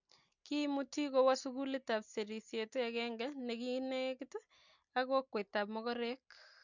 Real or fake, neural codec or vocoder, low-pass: real; none; 7.2 kHz